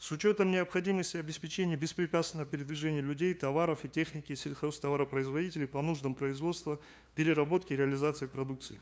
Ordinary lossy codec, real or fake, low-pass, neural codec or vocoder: none; fake; none; codec, 16 kHz, 2 kbps, FunCodec, trained on LibriTTS, 25 frames a second